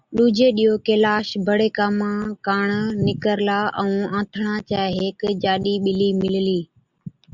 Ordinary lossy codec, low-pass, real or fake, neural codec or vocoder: Opus, 64 kbps; 7.2 kHz; real; none